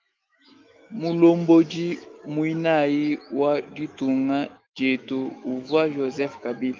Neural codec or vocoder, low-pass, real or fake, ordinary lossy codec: autoencoder, 48 kHz, 128 numbers a frame, DAC-VAE, trained on Japanese speech; 7.2 kHz; fake; Opus, 24 kbps